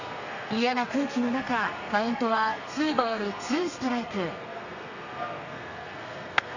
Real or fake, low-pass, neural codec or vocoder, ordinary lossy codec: fake; 7.2 kHz; codec, 32 kHz, 1.9 kbps, SNAC; none